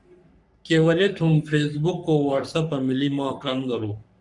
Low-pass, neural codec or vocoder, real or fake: 10.8 kHz; codec, 44.1 kHz, 3.4 kbps, Pupu-Codec; fake